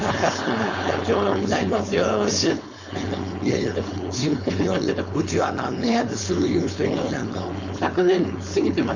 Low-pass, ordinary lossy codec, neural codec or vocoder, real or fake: 7.2 kHz; Opus, 64 kbps; codec, 16 kHz, 4.8 kbps, FACodec; fake